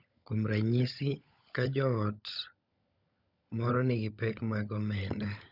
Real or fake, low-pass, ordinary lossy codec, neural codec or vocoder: fake; 5.4 kHz; none; codec, 16 kHz, 16 kbps, FunCodec, trained on LibriTTS, 50 frames a second